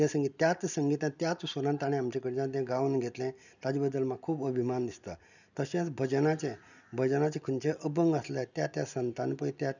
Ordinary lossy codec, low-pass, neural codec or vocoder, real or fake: none; 7.2 kHz; none; real